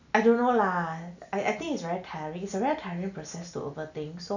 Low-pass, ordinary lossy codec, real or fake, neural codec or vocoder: 7.2 kHz; none; real; none